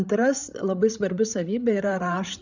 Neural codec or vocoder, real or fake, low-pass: codec, 16 kHz, 16 kbps, FreqCodec, larger model; fake; 7.2 kHz